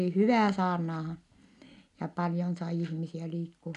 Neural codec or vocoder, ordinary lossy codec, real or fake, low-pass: none; none; real; 10.8 kHz